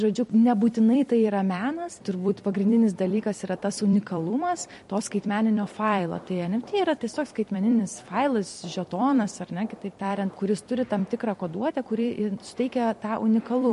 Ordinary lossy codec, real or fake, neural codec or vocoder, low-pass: MP3, 48 kbps; fake; vocoder, 44.1 kHz, 128 mel bands every 256 samples, BigVGAN v2; 14.4 kHz